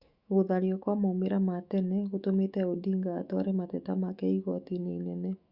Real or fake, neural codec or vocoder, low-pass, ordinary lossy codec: fake; autoencoder, 48 kHz, 128 numbers a frame, DAC-VAE, trained on Japanese speech; 5.4 kHz; Opus, 64 kbps